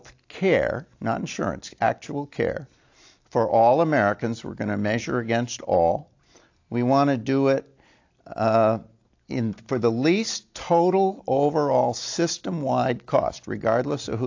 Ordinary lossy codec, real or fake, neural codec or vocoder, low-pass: AAC, 48 kbps; real; none; 7.2 kHz